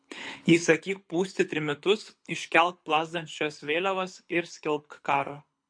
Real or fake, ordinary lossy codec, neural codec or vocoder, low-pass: fake; MP3, 48 kbps; codec, 24 kHz, 6 kbps, HILCodec; 9.9 kHz